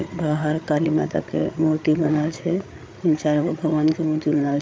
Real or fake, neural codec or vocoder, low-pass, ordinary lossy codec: fake; codec, 16 kHz, 8 kbps, FreqCodec, larger model; none; none